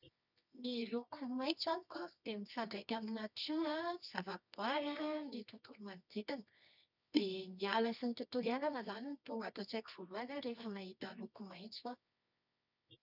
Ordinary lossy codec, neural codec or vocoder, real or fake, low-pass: none; codec, 24 kHz, 0.9 kbps, WavTokenizer, medium music audio release; fake; 5.4 kHz